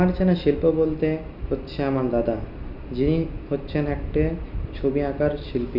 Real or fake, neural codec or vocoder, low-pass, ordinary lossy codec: real; none; 5.4 kHz; none